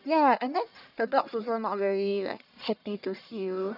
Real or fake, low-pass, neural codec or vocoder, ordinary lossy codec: fake; 5.4 kHz; codec, 44.1 kHz, 1.7 kbps, Pupu-Codec; none